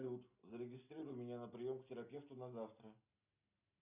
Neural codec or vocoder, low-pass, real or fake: none; 3.6 kHz; real